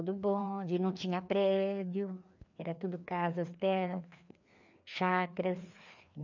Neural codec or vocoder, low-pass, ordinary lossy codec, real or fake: codec, 16 kHz, 2 kbps, FreqCodec, larger model; 7.2 kHz; none; fake